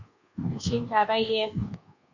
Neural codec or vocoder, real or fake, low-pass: codec, 24 kHz, 1.2 kbps, DualCodec; fake; 7.2 kHz